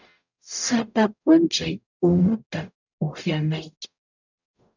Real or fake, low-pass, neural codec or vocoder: fake; 7.2 kHz; codec, 44.1 kHz, 0.9 kbps, DAC